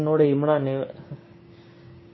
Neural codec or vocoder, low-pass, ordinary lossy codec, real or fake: codec, 16 kHz, 6 kbps, DAC; 7.2 kHz; MP3, 24 kbps; fake